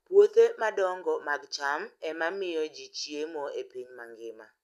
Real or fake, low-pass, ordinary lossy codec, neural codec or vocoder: real; 14.4 kHz; none; none